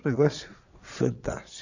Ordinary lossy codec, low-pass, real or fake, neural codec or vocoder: none; 7.2 kHz; fake; vocoder, 22.05 kHz, 80 mel bands, Vocos